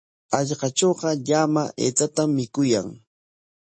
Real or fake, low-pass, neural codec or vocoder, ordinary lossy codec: real; 9.9 kHz; none; MP3, 32 kbps